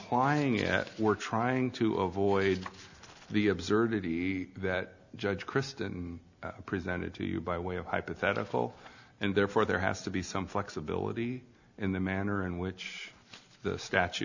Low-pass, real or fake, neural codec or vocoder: 7.2 kHz; real; none